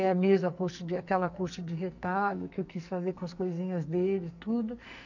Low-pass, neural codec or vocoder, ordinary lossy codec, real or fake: 7.2 kHz; codec, 44.1 kHz, 2.6 kbps, SNAC; none; fake